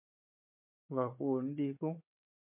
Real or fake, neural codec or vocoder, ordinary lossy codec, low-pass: fake; codec, 16 kHz, 4 kbps, FunCodec, trained on Chinese and English, 50 frames a second; MP3, 32 kbps; 3.6 kHz